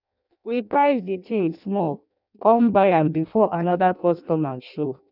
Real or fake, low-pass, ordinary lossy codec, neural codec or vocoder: fake; 5.4 kHz; none; codec, 16 kHz in and 24 kHz out, 0.6 kbps, FireRedTTS-2 codec